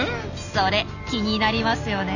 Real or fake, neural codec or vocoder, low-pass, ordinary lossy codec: real; none; 7.2 kHz; none